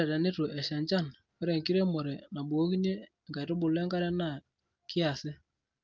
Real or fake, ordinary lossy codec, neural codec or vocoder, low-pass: real; Opus, 32 kbps; none; 7.2 kHz